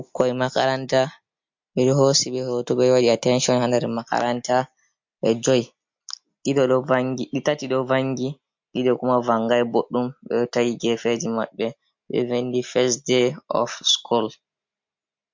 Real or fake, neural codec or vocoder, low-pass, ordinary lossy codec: real; none; 7.2 kHz; MP3, 48 kbps